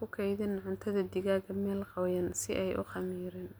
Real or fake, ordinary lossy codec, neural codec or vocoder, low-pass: real; none; none; none